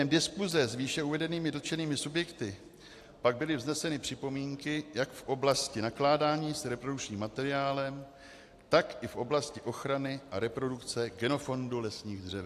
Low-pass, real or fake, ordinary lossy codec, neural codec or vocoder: 14.4 kHz; real; AAC, 64 kbps; none